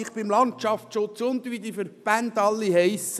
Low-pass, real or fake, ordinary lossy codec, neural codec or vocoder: 14.4 kHz; real; none; none